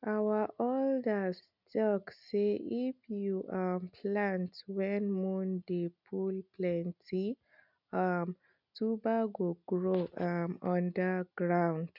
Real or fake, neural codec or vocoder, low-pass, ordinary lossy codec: real; none; 5.4 kHz; none